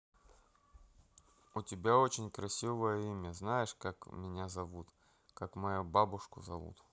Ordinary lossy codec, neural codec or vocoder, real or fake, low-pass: none; none; real; none